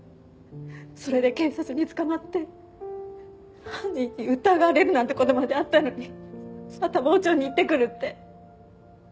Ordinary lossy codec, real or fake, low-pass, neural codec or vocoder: none; real; none; none